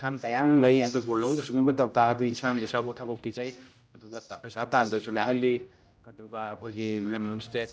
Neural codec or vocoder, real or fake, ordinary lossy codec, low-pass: codec, 16 kHz, 0.5 kbps, X-Codec, HuBERT features, trained on general audio; fake; none; none